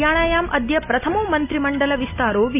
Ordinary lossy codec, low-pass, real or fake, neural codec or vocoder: none; 3.6 kHz; real; none